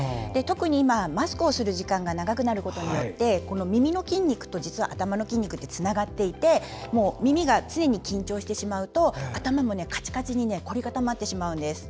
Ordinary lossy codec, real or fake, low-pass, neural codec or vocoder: none; real; none; none